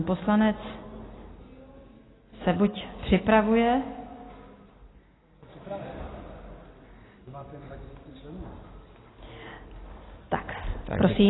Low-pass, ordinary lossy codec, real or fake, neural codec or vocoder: 7.2 kHz; AAC, 16 kbps; real; none